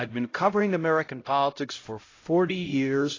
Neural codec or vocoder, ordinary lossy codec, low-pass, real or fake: codec, 16 kHz, 0.5 kbps, X-Codec, HuBERT features, trained on LibriSpeech; AAC, 32 kbps; 7.2 kHz; fake